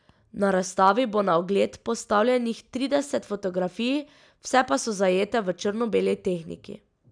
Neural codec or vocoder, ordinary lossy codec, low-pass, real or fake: none; none; 9.9 kHz; real